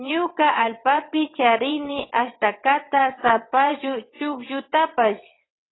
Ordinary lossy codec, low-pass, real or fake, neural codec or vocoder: AAC, 16 kbps; 7.2 kHz; fake; vocoder, 22.05 kHz, 80 mel bands, WaveNeXt